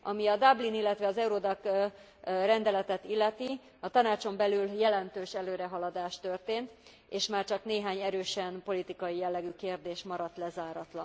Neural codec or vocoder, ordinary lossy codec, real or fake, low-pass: none; none; real; none